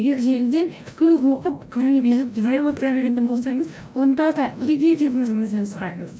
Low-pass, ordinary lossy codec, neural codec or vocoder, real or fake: none; none; codec, 16 kHz, 0.5 kbps, FreqCodec, larger model; fake